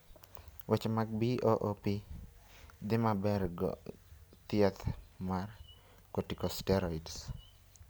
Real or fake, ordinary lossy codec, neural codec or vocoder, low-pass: real; none; none; none